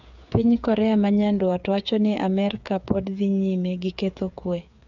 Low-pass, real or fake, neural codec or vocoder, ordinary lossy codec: 7.2 kHz; fake; codec, 16 kHz, 8 kbps, FreqCodec, smaller model; none